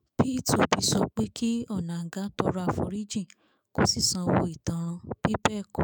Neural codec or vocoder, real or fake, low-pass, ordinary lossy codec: autoencoder, 48 kHz, 128 numbers a frame, DAC-VAE, trained on Japanese speech; fake; none; none